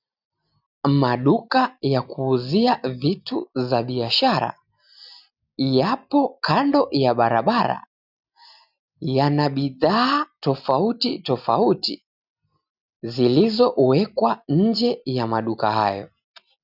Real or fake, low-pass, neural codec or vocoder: real; 5.4 kHz; none